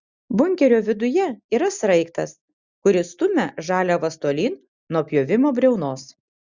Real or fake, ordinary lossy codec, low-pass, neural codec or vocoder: real; Opus, 64 kbps; 7.2 kHz; none